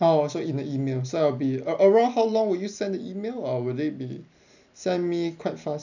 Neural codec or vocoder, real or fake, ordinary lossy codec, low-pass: none; real; none; 7.2 kHz